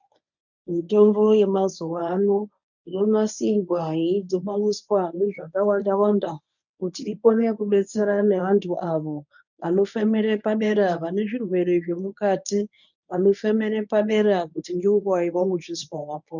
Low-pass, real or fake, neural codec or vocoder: 7.2 kHz; fake; codec, 24 kHz, 0.9 kbps, WavTokenizer, medium speech release version 1